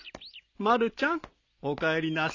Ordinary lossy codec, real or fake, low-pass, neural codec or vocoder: none; real; 7.2 kHz; none